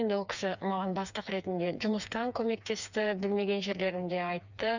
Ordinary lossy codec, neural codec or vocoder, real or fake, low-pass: none; codec, 16 kHz, 4 kbps, FreqCodec, smaller model; fake; 7.2 kHz